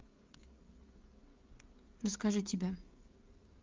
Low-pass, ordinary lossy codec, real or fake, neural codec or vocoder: 7.2 kHz; Opus, 32 kbps; fake; codec, 16 kHz, 16 kbps, FreqCodec, smaller model